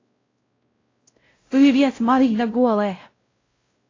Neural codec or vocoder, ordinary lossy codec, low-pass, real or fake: codec, 16 kHz, 0.5 kbps, X-Codec, WavLM features, trained on Multilingual LibriSpeech; AAC, 32 kbps; 7.2 kHz; fake